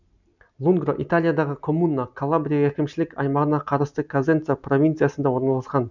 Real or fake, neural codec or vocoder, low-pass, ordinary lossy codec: real; none; 7.2 kHz; none